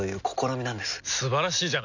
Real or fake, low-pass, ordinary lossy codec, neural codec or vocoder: real; 7.2 kHz; none; none